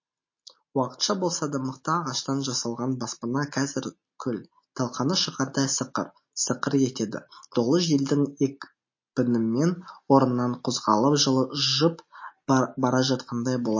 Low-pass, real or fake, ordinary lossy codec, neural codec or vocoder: 7.2 kHz; real; MP3, 32 kbps; none